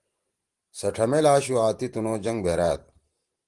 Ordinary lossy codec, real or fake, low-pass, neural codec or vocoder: Opus, 24 kbps; fake; 10.8 kHz; vocoder, 44.1 kHz, 128 mel bands every 512 samples, BigVGAN v2